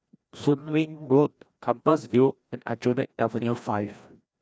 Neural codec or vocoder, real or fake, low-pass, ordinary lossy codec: codec, 16 kHz, 1 kbps, FreqCodec, larger model; fake; none; none